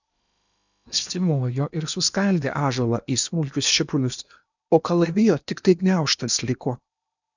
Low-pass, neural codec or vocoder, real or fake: 7.2 kHz; codec, 16 kHz in and 24 kHz out, 0.8 kbps, FocalCodec, streaming, 65536 codes; fake